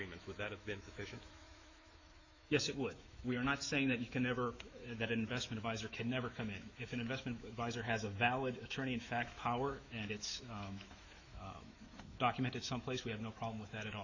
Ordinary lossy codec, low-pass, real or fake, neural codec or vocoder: AAC, 48 kbps; 7.2 kHz; fake; autoencoder, 48 kHz, 128 numbers a frame, DAC-VAE, trained on Japanese speech